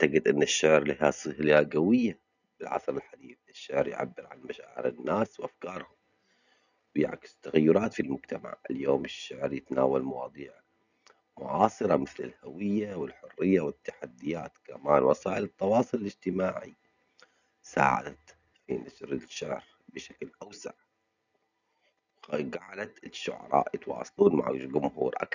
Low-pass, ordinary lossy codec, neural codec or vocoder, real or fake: 7.2 kHz; none; none; real